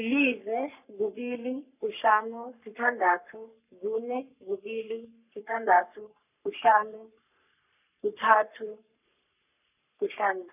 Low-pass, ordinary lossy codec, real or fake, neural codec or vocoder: 3.6 kHz; none; fake; codec, 44.1 kHz, 3.4 kbps, Pupu-Codec